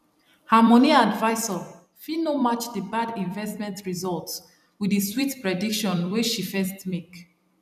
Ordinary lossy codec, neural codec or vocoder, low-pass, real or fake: none; vocoder, 44.1 kHz, 128 mel bands every 256 samples, BigVGAN v2; 14.4 kHz; fake